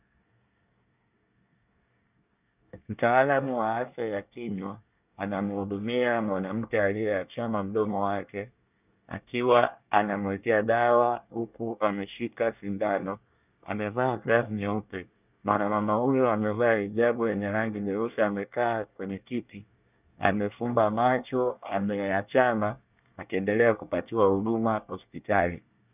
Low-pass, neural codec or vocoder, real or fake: 3.6 kHz; codec, 24 kHz, 1 kbps, SNAC; fake